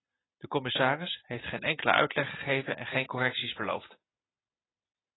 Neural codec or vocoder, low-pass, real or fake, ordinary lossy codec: none; 7.2 kHz; real; AAC, 16 kbps